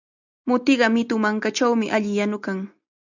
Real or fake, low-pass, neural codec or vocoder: real; 7.2 kHz; none